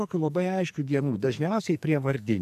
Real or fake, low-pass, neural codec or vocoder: fake; 14.4 kHz; codec, 44.1 kHz, 2.6 kbps, SNAC